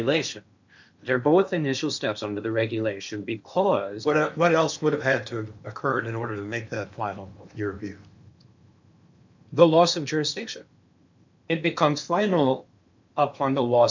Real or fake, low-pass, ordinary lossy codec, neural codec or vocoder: fake; 7.2 kHz; MP3, 64 kbps; codec, 16 kHz in and 24 kHz out, 0.8 kbps, FocalCodec, streaming, 65536 codes